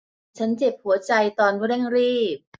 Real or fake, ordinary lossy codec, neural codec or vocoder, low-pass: real; none; none; none